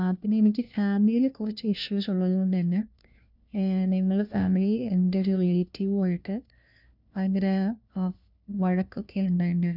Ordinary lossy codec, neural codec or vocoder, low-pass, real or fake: none; codec, 16 kHz, 1 kbps, FunCodec, trained on LibriTTS, 50 frames a second; 5.4 kHz; fake